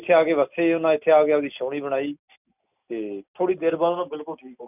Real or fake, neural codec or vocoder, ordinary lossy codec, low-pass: real; none; none; 3.6 kHz